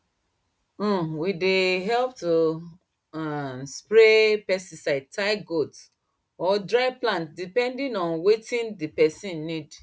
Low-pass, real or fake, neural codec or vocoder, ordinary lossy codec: none; real; none; none